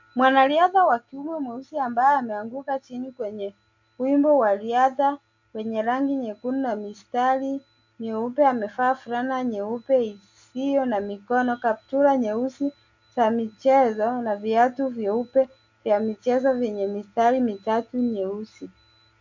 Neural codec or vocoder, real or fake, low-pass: none; real; 7.2 kHz